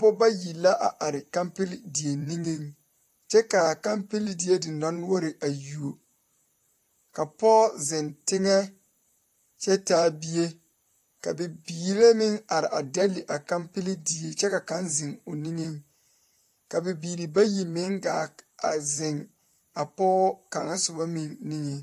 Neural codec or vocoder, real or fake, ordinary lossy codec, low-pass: vocoder, 44.1 kHz, 128 mel bands, Pupu-Vocoder; fake; AAC, 64 kbps; 14.4 kHz